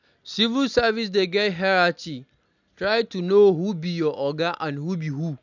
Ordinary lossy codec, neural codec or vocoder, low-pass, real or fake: none; none; 7.2 kHz; real